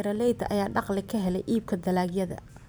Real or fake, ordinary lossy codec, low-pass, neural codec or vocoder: fake; none; none; vocoder, 44.1 kHz, 128 mel bands every 256 samples, BigVGAN v2